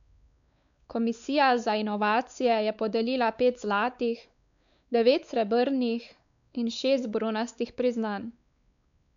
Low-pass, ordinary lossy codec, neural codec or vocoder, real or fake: 7.2 kHz; none; codec, 16 kHz, 4 kbps, X-Codec, WavLM features, trained on Multilingual LibriSpeech; fake